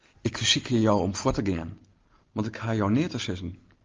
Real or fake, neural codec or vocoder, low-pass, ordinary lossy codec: real; none; 7.2 kHz; Opus, 32 kbps